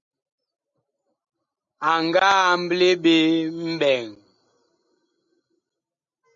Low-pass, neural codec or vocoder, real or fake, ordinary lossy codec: 7.2 kHz; none; real; MP3, 48 kbps